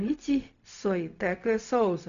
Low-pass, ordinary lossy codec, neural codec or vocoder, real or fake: 7.2 kHz; AAC, 96 kbps; codec, 16 kHz, 0.4 kbps, LongCat-Audio-Codec; fake